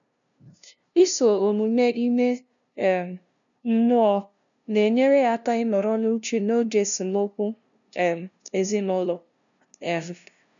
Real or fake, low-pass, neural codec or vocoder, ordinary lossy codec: fake; 7.2 kHz; codec, 16 kHz, 0.5 kbps, FunCodec, trained on LibriTTS, 25 frames a second; none